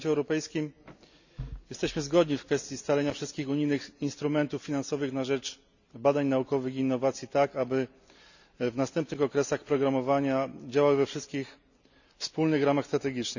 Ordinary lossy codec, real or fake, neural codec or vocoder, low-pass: none; real; none; 7.2 kHz